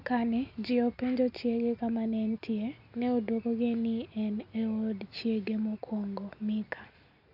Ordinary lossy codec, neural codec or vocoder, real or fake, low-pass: none; none; real; 5.4 kHz